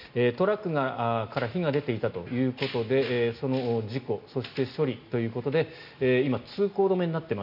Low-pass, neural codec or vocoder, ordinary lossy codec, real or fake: 5.4 kHz; none; none; real